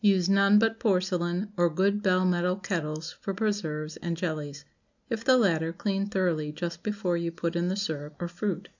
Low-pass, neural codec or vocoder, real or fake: 7.2 kHz; none; real